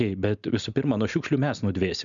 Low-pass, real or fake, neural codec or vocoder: 7.2 kHz; real; none